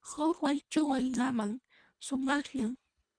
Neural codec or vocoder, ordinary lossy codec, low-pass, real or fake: codec, 24 kHz, 1.5 kbps, HILCodec; MP3, 96 kbps; 9.9 kHz; fake